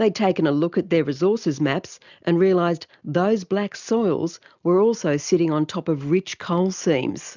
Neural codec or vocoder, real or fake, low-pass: none; real; 7.2 kHz